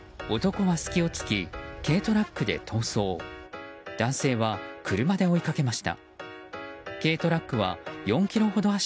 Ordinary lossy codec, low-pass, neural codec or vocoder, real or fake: none; none; none; real